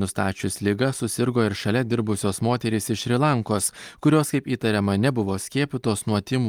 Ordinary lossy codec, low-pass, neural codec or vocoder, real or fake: Opus, 32 kbps; 19.8 kHz; none; real